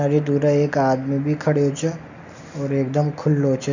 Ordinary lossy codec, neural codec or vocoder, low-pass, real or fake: none; none; 7.2 kHz; real